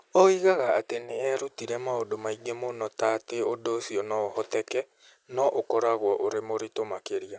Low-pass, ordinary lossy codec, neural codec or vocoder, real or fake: none; none; none; real